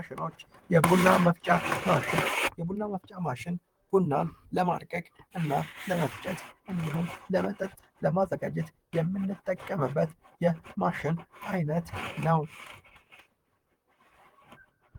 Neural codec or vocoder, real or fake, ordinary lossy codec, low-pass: vocoder, 44.1 kHz, 128 mel bands, Pupu-Vocoder; fake; Opus, 24 kbps; 19.8 kHz